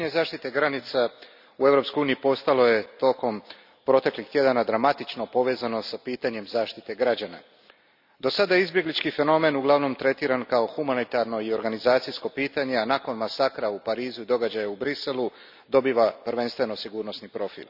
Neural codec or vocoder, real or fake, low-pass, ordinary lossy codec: none; real; 5.4 kHz; none